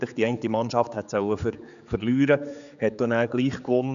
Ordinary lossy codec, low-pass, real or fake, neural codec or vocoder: none; 7.2 kHz; fake; codec, 16 kHz, 4 kbps, X-Codec, HuBERT features, trained on general audio